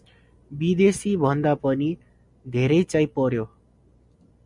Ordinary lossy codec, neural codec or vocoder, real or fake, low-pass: MP3, 96 kbps; none; real; 10.8 kHz